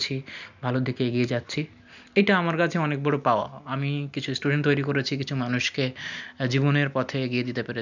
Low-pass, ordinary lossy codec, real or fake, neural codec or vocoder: 7.2 kHz; none; real; none